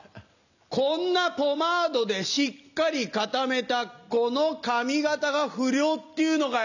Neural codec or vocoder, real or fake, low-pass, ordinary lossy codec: none; real; 7.2 kHz; none